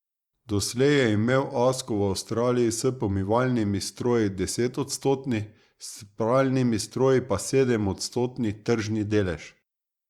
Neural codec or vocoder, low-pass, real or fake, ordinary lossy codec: vocoder, 44.1 kHz, 128 mel bands every 512 samples, BigVGAN v2; 19.8 kHz; fake; Opus, 64 kbps